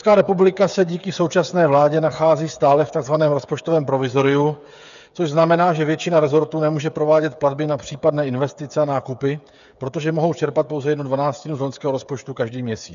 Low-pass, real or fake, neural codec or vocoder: 7.2 kHz; fake; codec, 16 kHz, 8 kbps, FreqCodec, smaller model